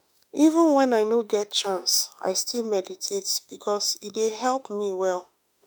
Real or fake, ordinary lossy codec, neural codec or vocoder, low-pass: fake; none; autoencoder, 48 kHz, 32 numbers a frame, DAC-VAE, trained on Japanese speech; none